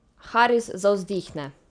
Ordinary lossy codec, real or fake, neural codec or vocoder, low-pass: none; real; none; 9.9 kHz